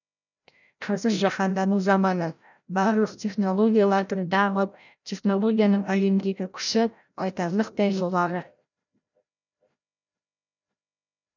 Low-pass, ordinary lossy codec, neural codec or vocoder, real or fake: 7.2 kHz; none; codec, 16 kHz, 0.5 kbps, FreqCodec, larger model; fake